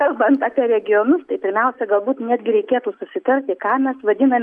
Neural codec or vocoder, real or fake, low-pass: none; real; 10.8 kHz